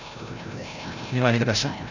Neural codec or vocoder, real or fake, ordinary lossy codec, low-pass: codec, 16 kHz, 0.5 kbps, FreqCodec, larger model; fake; none; 7.2 kHz